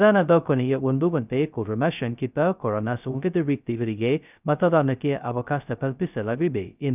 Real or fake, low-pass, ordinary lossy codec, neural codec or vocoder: fake; 3.6 kHz; none; codec, 16 kHz, 0.2 kbps, FocalCodec